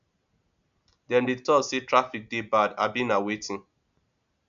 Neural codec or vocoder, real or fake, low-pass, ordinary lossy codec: none; real; 7.2 kHz; none